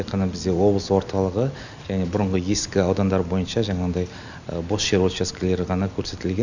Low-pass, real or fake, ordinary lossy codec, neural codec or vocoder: 7.2 kHz; real; none; none